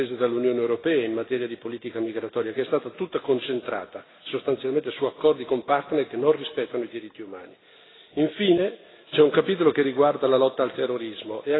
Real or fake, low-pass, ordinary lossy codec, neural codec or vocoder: real; 7.2 kHz; AAC, 16 kbps; none